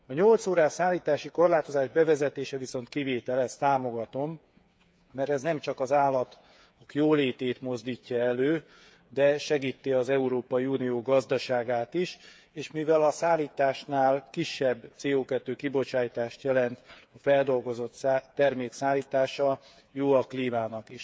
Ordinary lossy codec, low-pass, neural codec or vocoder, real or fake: none; none; codec, 16 kHz, 8 kbps, FreqCodec, smaller model; fake